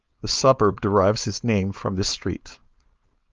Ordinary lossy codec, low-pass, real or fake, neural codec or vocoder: Opus, 32 kbps; 7.2 kHz; fake; codec, 16 kHz, 4.8 kbps, FACodec